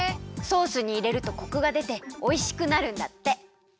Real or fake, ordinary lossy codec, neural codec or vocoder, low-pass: real; none; none; none